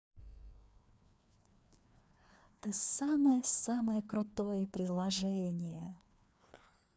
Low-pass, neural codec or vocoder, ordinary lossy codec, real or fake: none; codec, 16 kHz, 2 kbps, FreqCodec, larger model; none; fake